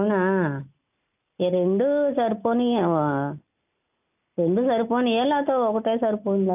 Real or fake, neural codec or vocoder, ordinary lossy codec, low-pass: real; none; none; 3.6 kHz